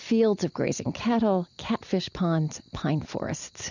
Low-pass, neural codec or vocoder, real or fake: 7.2 kHz; vocoder, 44.1 kHz, 128 mel bands every 256 samples, BigVGAN v2; fake